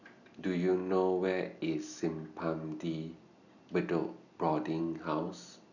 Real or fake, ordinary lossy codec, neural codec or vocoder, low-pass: real; none; none; 7.2 kHz